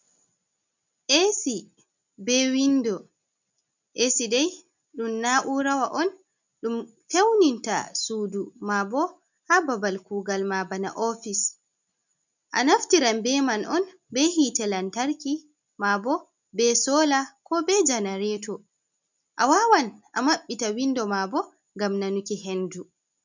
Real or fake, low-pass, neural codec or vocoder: real; 7.2 kHz; none